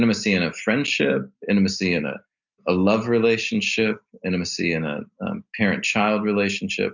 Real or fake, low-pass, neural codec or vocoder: real; 7.2 kHz; none